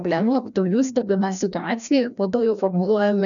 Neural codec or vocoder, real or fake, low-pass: codec, 16 kHz, 1 kbps, FreqCodec, larger model; fake; 7.2 kHz